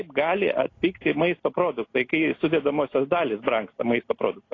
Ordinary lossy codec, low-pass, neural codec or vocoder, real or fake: AAC, 32 kbps; 7.2 kHz; none; real